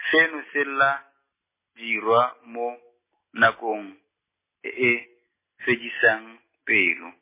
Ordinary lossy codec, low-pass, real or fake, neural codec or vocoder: MP3, 16 kbps; 3.6 kHz; real; none